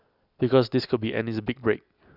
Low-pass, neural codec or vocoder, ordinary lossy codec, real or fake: 5.4 kHz; none; none; real